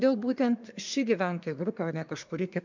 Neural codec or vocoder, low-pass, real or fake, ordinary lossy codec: codec, 24 kHz, 1 kbps, SNAC; 7.2 kHz; fake; MP3, 64 kbps